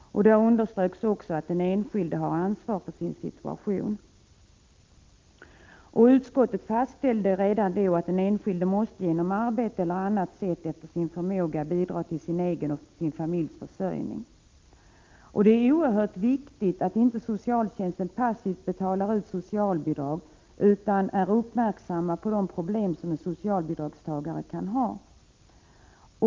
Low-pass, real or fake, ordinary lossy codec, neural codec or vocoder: 7.2 kHz; real; Opus, 24 kbps; none